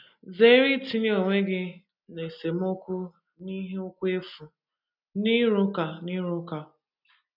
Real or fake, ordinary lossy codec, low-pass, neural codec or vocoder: real; none; 5.4 kHz; none